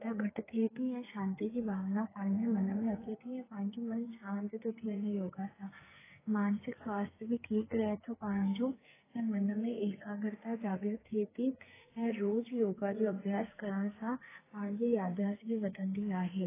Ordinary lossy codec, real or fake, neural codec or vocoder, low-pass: AAC, 16 kbps; fake; codec, 44.1 kHz, 2.6 kbps, SNAC; 3.6 kHz